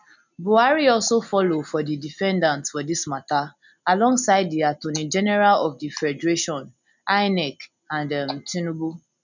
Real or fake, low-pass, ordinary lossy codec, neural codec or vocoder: real; 7.2 kHz; none; none